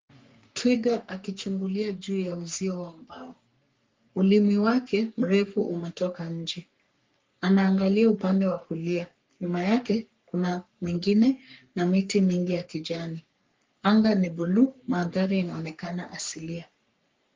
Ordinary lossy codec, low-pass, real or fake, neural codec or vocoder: Opus, 32 kbps; 7.2 kHz; fake; codec, 44.1 kHz, 3.4 kbps, Pupu-Codec